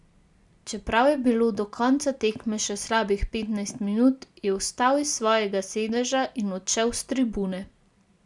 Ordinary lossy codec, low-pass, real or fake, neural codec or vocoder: none; 10.8 kHz; real; none